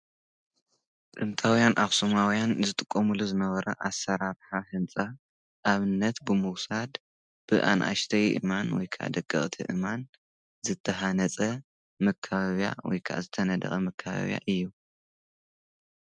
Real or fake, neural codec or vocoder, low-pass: real; none; 9.9 kHz